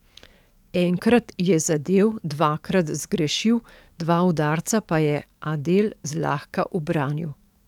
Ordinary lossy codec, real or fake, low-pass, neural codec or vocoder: none; fake; 19.8 kHz; codec, 44.1 kHz, 7.8 kbps, DAC